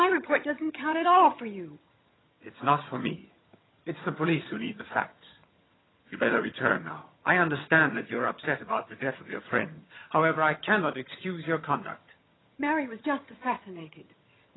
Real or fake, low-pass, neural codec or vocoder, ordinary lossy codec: fake; 7.2 kHz; vocoder, 22.05 kHz, 80 mel bands, HiFi-GAN; AAC, 16 kbps